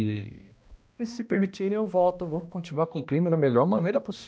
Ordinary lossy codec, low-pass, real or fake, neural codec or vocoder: none; none; fake; codec, 16 kHz, 1 kbps, X-Codec, HuBERT features, trained on balanced general audio